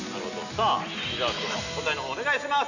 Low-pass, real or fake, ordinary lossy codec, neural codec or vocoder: 7.2 kHz; real; none; none